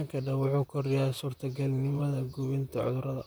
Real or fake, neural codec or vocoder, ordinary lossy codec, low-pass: fake; vocoder, 44.1 kHz, 128 mel bands, Pupu-Vocoder; none; none